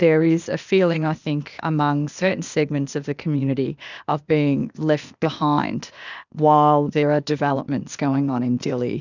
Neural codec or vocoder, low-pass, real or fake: codec, 16 kHz, 0.8 kbps, ZipCodec; 7.2 kHz; fake